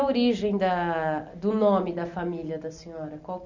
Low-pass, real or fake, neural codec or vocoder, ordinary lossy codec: 7.2 kHz; real; none; none